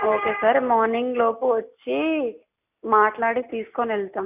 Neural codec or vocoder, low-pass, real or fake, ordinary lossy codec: none; 3.6 kHz; real; none